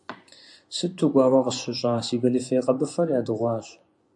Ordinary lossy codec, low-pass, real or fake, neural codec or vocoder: AAC, 64 kbps; 10.8 kHz; fake; vocoder, 24 kHz, 100 mel bands, Vocos